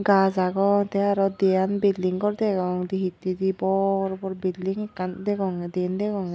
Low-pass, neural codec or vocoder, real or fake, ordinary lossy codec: none; none; real; none